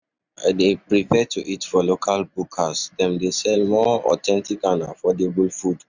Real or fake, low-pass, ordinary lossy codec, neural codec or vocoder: real; 7.2 kHz; none; none